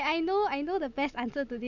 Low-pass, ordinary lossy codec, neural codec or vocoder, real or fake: 7.2 kHz; none; none; real